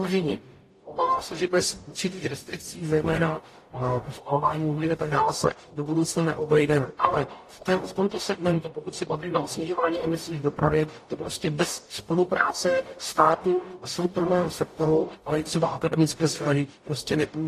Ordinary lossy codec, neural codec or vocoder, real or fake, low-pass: AAC, 48 kbps; codec, 44.1 kHz, 0.9 kbps, DAC; fake; 14.4 kHz